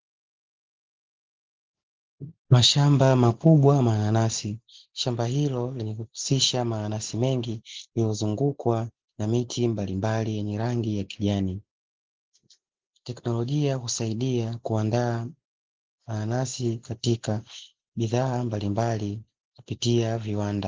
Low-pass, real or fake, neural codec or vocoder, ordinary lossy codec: 7.2 kHz; real; none; Opus, 16 kbps